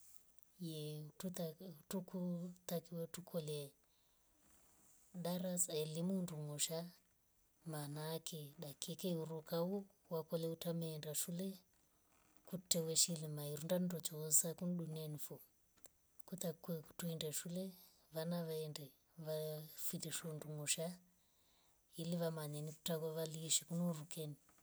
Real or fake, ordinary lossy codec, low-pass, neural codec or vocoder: real; none; none; none